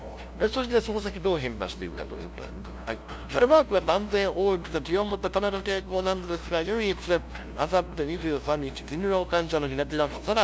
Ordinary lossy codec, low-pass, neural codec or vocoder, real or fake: none; none; codec, 16 kHz, 0.5 kbps, FunCodec, trained on LibriTTS, 25 frames a second; fake